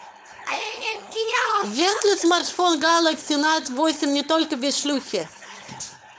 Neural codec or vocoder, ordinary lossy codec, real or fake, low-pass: codec, 16 kHz, 4.8 kbps, FACodec; none; fake; none